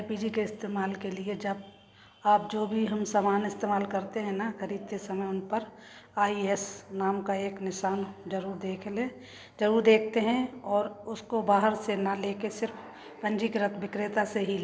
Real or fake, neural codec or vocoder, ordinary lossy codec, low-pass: real; none; none; none